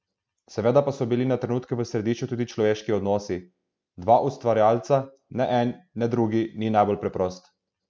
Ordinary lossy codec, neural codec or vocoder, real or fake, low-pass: none; none; real; none